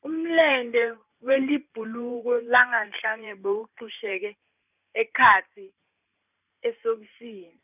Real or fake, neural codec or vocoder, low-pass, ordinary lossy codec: fake; vocoder, 44.1 kHz, 128 mel bands every 512 samples, BigVGAN v2; 3.6 kHz; none